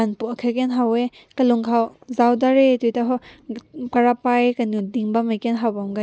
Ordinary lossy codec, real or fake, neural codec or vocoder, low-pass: none; real; none; none